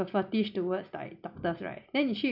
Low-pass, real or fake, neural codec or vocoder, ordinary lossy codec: 5.4 kHz; real; none; none